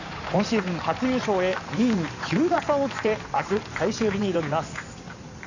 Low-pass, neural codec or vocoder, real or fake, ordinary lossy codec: 7.2 kHz; codec, 16 kHz in and 24 kHz out, 2.2 kbps, FireRedTTS-2 codec; fake; none